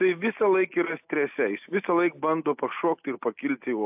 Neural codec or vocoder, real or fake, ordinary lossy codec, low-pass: none; real; AAC, 32 kbps; 3.6 kHz